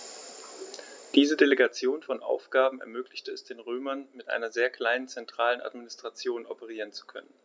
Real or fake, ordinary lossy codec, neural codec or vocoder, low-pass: real; none; none; none